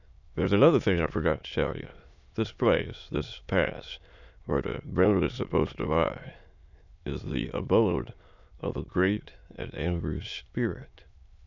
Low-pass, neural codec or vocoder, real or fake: 7.2 kHz; autoencoder, 22.05 kHz, a latent of 192 numbers a frame, VITS, trained on many speakers; fake